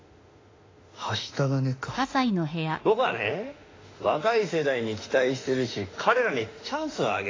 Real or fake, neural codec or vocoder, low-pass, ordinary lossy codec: fake; autoencoder, 48 kHz, 32 numbers a frame, DAC-VAE, trained on Japanese speech; 7.2 kHz; AAC, 32 kbps